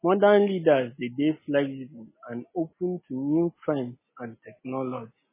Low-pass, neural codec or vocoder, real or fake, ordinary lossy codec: 3.6 kHz; none; real; MP3, 16 kbps